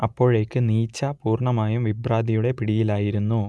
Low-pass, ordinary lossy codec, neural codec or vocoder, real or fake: none; none; none; real